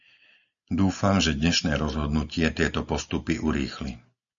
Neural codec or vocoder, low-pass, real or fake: none; 7.2 kHz; real